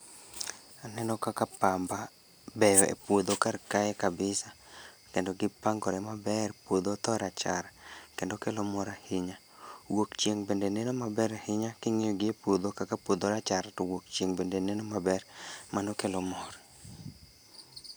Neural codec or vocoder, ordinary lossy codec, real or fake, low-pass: none; none; real; none